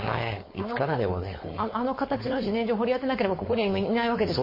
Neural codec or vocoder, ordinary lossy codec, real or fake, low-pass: codec, 16 kHz, 4.8 kbps, FACodec; MP3, 24 kbps; fake; 5.4 kHz